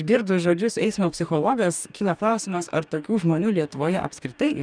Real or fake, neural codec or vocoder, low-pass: fake; codec, 44.1 kHz, 2.6 kbps, DAC; 9.9 kHz